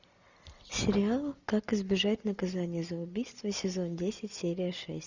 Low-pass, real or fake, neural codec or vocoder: 7.2 kHz; real; none